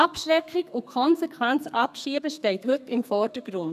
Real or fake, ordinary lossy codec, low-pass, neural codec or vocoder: fake; none; 14.4 kHz; codec, 32 kHz, 1.9 kbps, SNAC